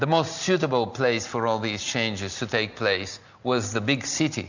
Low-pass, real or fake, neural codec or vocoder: 7.2 kHz; real; none